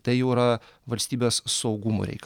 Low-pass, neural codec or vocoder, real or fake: 19.8 kHz; none; real